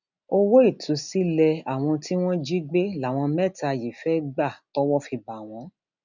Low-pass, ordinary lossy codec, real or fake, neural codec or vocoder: 7.2 kHz; none; real; none